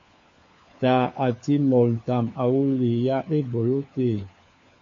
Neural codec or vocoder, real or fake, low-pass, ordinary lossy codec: codec, 16 kHz, 4 kbps, FunCodec, trained on LibriTTS, 50 frames a second; fake; 7.2 kHz; MP3, 48 kbps